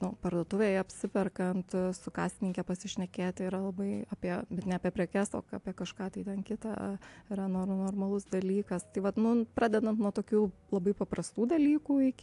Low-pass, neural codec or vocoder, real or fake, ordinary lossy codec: 10.8 kHz; none; real; AAC, 64 kbps